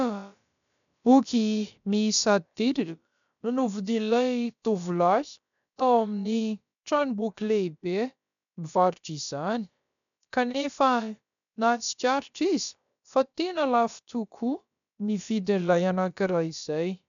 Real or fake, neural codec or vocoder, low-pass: fake; codec, 16 kHz, about 1 kbps, DyCAST, with the encoder's durations; 7.2 kHz